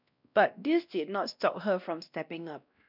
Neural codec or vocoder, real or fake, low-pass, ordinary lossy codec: codec, 16 kHz, 1 kbps, X-Codec, WavLM features, trained on Multilingual LibriSpeech; fake; 5.4 kHz; none